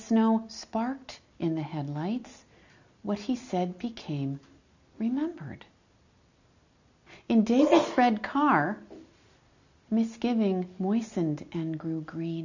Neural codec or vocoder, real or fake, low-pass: none; real; 7.2 kHz